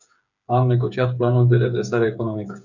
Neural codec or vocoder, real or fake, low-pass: codec, 16 kHz, 8 kbps, FreqCodec, smaller model; fake; 7.2 kHz